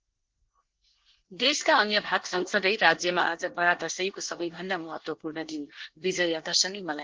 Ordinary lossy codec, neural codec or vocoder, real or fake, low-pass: Opus, 16 kbps; codec, 24 kHz, 1 kbps, SNAC; fake; 7.2 kHz